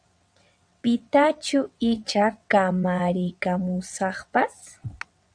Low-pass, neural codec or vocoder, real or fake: 9.9 kHz; vocoder, 22.05 kHz, 80 mel bands, WaveNeXt; fake